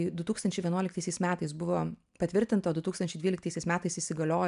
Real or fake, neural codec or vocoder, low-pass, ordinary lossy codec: real; none; 10.8 kHz; MP3, 96 kbps